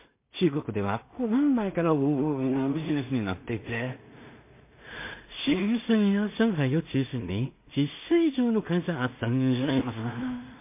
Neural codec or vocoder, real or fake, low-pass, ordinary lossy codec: codec, 16 kHz in and 24 kHz out, 0.4 kbps, LongCat-Audio-Codec, two codebook decoder; fake; 3.6 kHz; MP3, 24 kbps